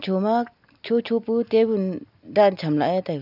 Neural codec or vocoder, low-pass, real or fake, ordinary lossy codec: none; 5.4 kHz; real; none